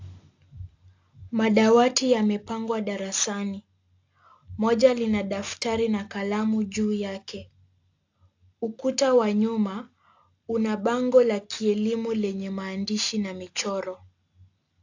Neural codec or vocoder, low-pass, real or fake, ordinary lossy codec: none; 7.2 kHz; real; AAC, 48 kbps